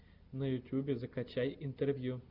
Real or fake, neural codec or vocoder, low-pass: real; none; 5.4 kHz